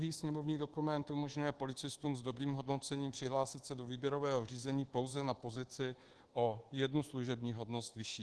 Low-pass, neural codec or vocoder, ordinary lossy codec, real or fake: 10.8 kHz; codec, 24 kHz, 1.2 kbps, DualCodec; Opus, 16 kbps; fake